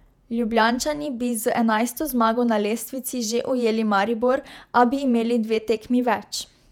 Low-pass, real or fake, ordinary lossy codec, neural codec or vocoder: 19.8 kHz; fake; none; vocoder, 48 kHz, 128 mel bands, Vocos